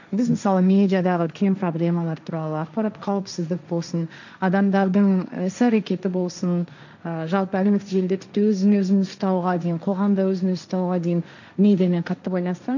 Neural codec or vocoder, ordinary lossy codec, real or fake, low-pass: codec, 16 kHz, 1.1 kbps, Voila-Tokenizer; none; fake; 7.2 kHz